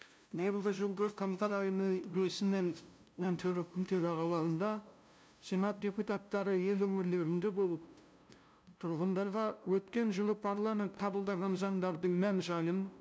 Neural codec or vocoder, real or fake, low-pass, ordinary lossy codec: codec, 16 kHz, 0.5 kbps, FunCodec, trained on LibriTTS, 25 frames a second; fake; none; none